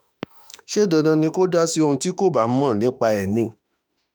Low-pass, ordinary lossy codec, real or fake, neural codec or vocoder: none; none; fake; autoencoder, 48 kHz, 32 numbers a frame, DAC-VAE, trained on Japanese speech